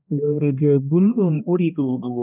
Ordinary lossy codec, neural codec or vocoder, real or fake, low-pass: none; codec, 16 kHz, 1 kbps, X-Codec, HuBERT features, trained on balanced general audio; fake; 3.6 kHz